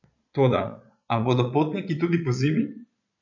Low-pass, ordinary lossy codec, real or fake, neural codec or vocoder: 7.2 kHz; none; fake; vocoder, 22.05 kHz, 80 mel bands, Vocos